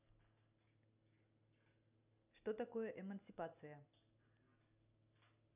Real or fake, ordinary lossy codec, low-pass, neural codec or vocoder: real; none; 3.6 kHz; none